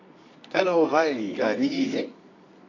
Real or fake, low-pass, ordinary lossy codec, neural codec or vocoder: fake; 7.2 kHz; AAC, 48 kbps; codec, 24 kHz, 0.9 kbps, WavTokenizer, medium music audio release